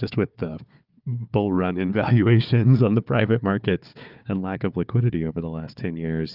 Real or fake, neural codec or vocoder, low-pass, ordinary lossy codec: fake; codec, 16 kHz, 4 kbps, FunCodec, trained on Chinese and English, 50 frames a second; 5.4 kHz; Opus, 24 kbps